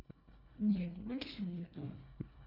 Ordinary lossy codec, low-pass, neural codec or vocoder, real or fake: none; 5.4 kHz; codec, 24 kHz, 1.5 kbps, HILCodec; fake